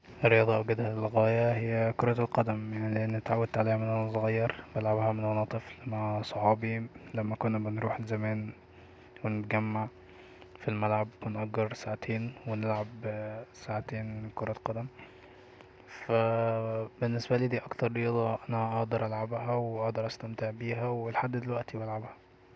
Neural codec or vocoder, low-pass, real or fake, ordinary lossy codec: none; none; real; none